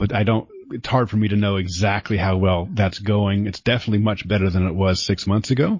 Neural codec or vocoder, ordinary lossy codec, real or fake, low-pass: none; MP3, 32 kbps; real; 7.2 kHz